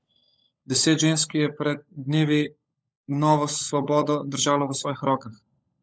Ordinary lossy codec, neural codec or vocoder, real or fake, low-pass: none; codec, 16 kHz, 16 kbps, FunCodec, trained on LibriTTS, 50 frames a second; fake; none